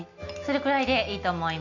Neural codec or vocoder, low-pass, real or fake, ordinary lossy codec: none; 7.2 kHz; real; none